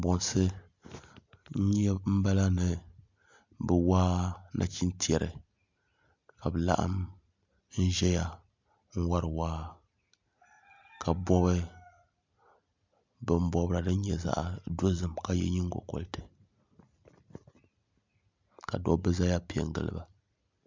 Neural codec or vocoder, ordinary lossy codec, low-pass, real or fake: none; MP3, 64 kbps; 7.2 kHz; real